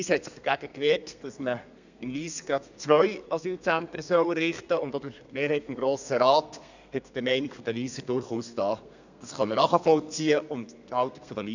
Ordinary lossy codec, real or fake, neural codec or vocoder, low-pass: none; fake; codec, 32 kHz, 1.9 kbps, SNAC; 7.2 kHz